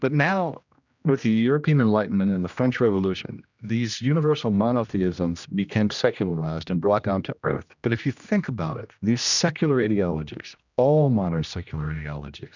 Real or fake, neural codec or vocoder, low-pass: fake; codec, 16 kHz, 1 kbps, X-Codec, HuBERT features, trained on general audio; 7.2 kHz